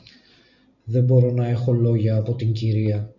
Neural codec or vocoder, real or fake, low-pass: none; real; 7.2 kHz